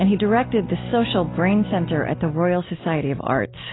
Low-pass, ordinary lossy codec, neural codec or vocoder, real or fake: 7.2 kHz; AAC, 16 kbps; none; real